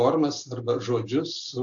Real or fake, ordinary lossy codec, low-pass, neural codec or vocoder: real; AAC, 48 kbps; 7.2 kHz; none